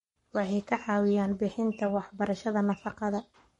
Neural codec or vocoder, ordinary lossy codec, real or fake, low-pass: codec, 44.1 kHz, 7.8 kbps, Pupu-Codec; MP3, 48 kbps; fake; 19.8 kHz